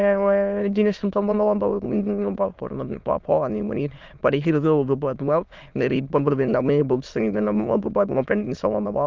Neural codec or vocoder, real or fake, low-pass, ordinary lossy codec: autoencoder, 22.05 kHz, a latent of 192 numbers a frame, VITS, trained on many speakers; fake; 7.2 kHz; Opus, 24 kbps